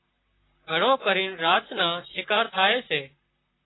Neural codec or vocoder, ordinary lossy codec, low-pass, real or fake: vocoder, 44.1 kHz, 128 mel bands, Pupu-Vocoder; AAC, 16 kbps; 7.2 kHz; fake